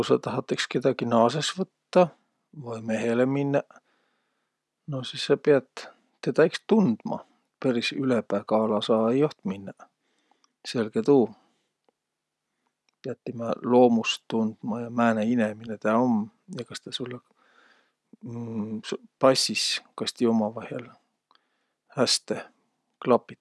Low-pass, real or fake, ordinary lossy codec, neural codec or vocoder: none; real; none; none